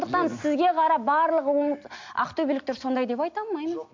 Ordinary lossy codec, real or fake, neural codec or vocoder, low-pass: MP3, 48 kbps; real; none; 7.2 kHz